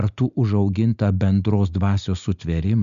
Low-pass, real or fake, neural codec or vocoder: 7.2 kHz; real; none